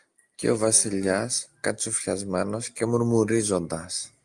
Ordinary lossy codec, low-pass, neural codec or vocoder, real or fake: Opus, 32 kbps; 10.8 kHz; none; real